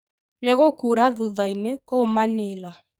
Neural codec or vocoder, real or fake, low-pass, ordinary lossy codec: codec, 44.1 kHz, 2.6 kbps, SNAC; fake; none; none